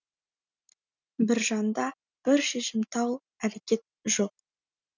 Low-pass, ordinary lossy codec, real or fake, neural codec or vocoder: 7.2 kHz; none; real; none